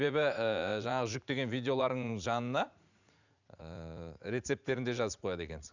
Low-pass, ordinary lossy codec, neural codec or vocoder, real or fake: 7.2 kHz; none; vocoder, 44.1 kHz, 80 mel bands, Vocos; fake